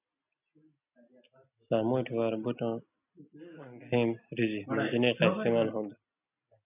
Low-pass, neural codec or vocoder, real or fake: 3.6 kHz; none; real